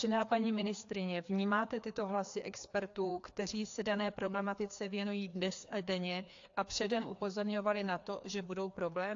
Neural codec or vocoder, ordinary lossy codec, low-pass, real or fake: codec, 16 kHz, 2 kbps, FreqCodec, larger model; AAC, 48 kbps; 7.2 kHz; fake